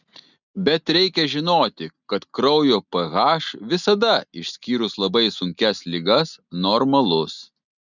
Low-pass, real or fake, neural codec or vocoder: 7.2 kHz; real; none